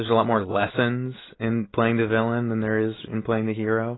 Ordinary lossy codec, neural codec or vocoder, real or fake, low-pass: AAC, 16 kbps; none; real; 7.2 kHz